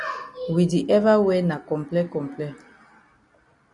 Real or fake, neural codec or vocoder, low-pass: real; none; 10.8 kHz